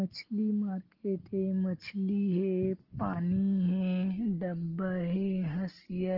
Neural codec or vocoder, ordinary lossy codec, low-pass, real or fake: none; Opus, 16 kbps; 5.4 kHz; real